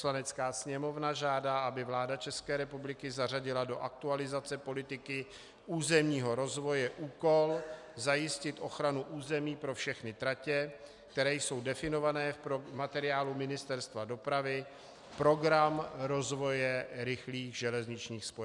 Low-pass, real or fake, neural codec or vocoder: 10.8 kHz; real; none